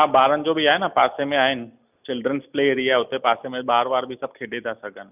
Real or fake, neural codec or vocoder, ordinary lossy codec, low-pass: real; none; none; 3.6 kHz